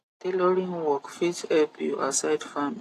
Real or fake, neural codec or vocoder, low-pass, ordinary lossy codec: real; none; 14.4 kHz; AAC, 48 kbps